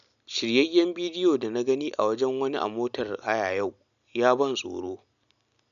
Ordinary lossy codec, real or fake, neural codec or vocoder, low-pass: none; real; none; 7.2 kHz